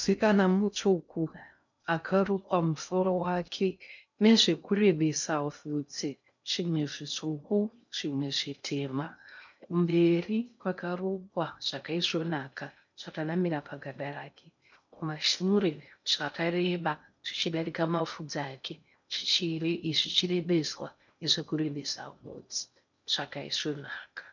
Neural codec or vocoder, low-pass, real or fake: codec, 16 kHz in and 24 kHz out, 0.6 kbps, FocalCodec, streaming, 2048 codes; 7.2 kHz; fake